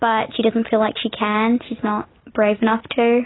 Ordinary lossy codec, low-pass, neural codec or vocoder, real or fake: AAC, 16 kbps; 7.2 kHz; none; real